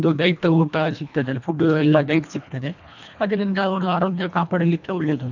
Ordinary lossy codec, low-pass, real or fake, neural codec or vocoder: none; 7.2 kHz; fake; codec, 24 kHz, 1.5 kbps, HILCodec